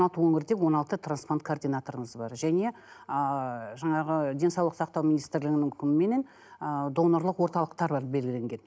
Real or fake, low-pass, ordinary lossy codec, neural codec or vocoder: real; none; none; none